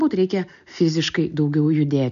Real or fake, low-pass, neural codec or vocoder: real; 7.2 kHz; none